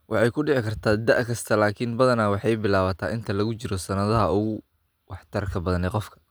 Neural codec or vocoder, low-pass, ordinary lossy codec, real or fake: none; none; none; real